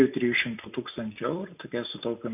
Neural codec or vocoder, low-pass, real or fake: none; 3.6 kHz; real